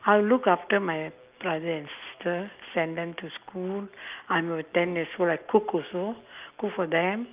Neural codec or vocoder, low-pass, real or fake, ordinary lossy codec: none; 3.6 kHz; real; Opus, 24 kbps